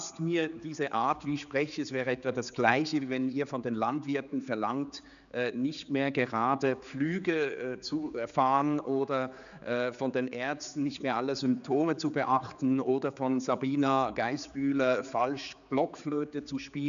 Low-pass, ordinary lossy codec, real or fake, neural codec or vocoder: 7.2 kHz; none; fake; codec, 16 kHz, 4 kbps, X-Codec, HuBERT features, trained on general audio